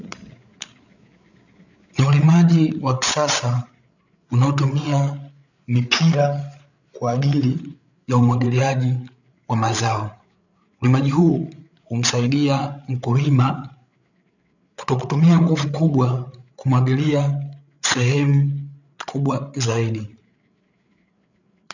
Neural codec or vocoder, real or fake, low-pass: codec, 16 kHz, 8 kbps, FreqCodec, larger model; fake; 7.2 kHz